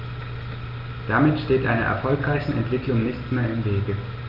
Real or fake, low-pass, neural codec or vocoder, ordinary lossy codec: real; 5.4 kHz; none; Opus, 32 kbps